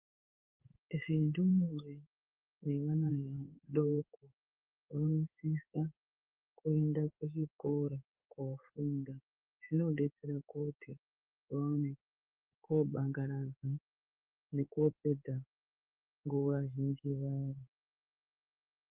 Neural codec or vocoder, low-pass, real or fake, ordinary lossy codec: codec, 16 kHz in and 24 kHz out, 1 kbps, XY-Tokenizer; 3.6 kHz; fake; Opus, 32 kbps